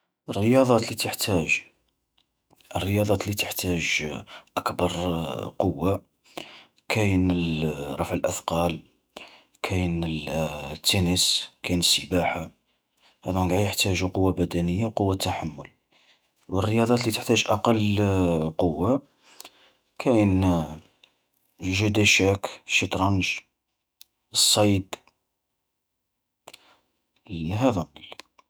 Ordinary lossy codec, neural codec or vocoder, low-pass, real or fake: none; autoencoder, 48 kHz, 128 numbers a frame, DAC-VAE, trained on Japanese speech; none; fake